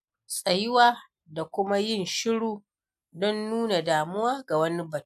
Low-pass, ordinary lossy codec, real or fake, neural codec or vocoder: 14.4 kHz; none; real; none